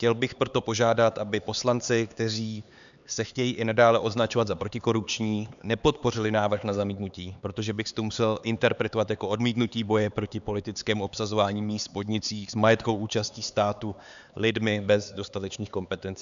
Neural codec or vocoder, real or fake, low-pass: codec, 16 kHz, 4 kbps, X-Codec, HuBERT features, trained on LibriSpeech; fake; 7.2 kHz